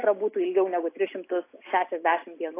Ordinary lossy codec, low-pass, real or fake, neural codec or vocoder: AAC, 24 kbps; 3.6 kHz; real; none